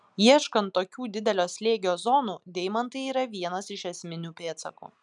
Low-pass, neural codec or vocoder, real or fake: 10.8 kHz; none; real